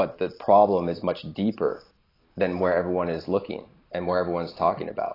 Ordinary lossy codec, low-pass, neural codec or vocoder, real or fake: AAC, 24 kbps; 5.4 kHz; none; real